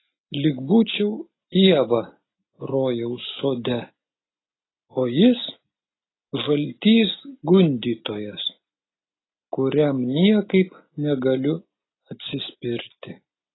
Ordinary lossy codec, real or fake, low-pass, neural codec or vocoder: AAC, 16 kbps; real; 7.2 kHz; none